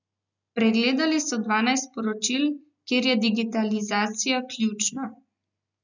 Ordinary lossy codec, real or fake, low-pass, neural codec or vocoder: none; real; 7.2 kHz; none